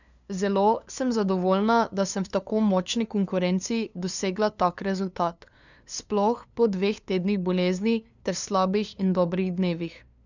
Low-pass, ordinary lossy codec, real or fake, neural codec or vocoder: 7.2 kHz; none; fake; codec, 16 kHz, 2 kbps, FunCodec, trained on LibriTTS, 25 frames a second